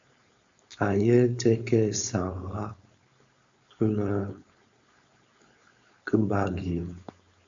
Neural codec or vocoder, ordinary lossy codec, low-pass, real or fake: codec, 16 kHz, 4.8 kbps, FACodec; Opus, 64 kbps; 7.2 kHz; fake